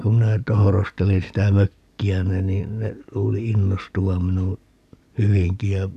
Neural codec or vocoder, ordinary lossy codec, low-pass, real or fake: codec, 44.1 kHz, 7.8 kbps, DAC; none; 14.4 kHz; fake